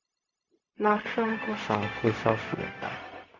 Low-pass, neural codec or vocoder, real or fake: 7.2 kHz; codec, 16 kHz, 0.4 kbps, LongCat-Audio-Codec; fake